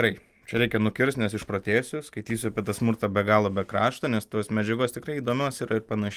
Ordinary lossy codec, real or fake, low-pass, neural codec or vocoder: Opus, 32 kbps; real; 14.4 kHz; none